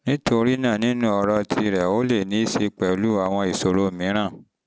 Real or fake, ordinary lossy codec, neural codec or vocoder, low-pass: real; none; none; none